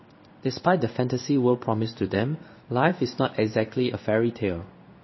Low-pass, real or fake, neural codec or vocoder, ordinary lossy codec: 7.2 kHz; fake; codec, 16 kHz in and 24 kHz out, 1 kbps, XY-Tokenizer; MP3, 24 kbps